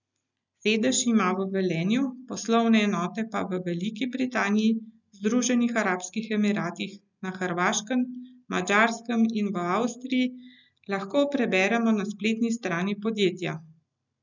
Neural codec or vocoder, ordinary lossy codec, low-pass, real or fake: none; none; 7.2 kHz; real